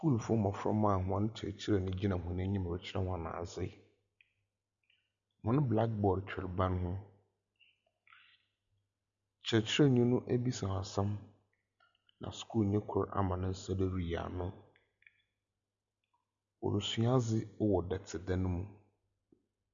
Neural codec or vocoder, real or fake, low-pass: none; real; 7.2 kHz